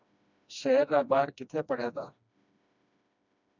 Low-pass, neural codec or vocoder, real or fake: 7.2 kHz; codec, 16 kHz, 1 kbps, FreqCodec, smaller model; fake